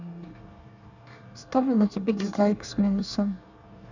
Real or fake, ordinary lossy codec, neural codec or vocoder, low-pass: fake; none; codec, 24 kHz, 1 kbps, SNAC; 7.2 kHz